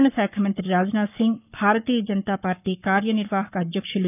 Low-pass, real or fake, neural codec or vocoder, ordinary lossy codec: 3.6 kHz; fake; codec, 44.1 kHz, 7.8 kbps, Pupu-Codec; none